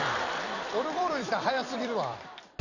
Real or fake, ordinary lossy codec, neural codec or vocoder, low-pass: fake; none; vocoder, 44.1 kHz, 128 mel bands every 256 samples, BigVGAN v2; 7.2 kHz